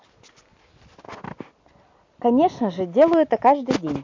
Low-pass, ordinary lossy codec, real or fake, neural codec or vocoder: 7.2 kHz; MP3, 48 kbps; real; none